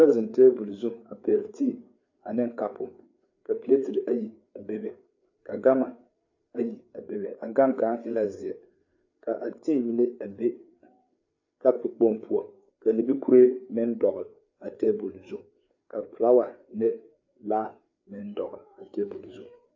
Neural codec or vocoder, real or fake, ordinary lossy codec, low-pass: codec, 16 kHz, 4 kbps, FreqCodec, larger model; fake; AAC, 48 kbps; 7.2 kHz